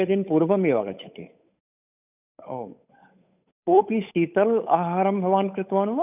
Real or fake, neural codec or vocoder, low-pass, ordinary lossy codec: fake; codec, 16 kHz, 8 kbps, FunCodec, trained on Chinese and English, 25 frames a second; 3.6 kHz; none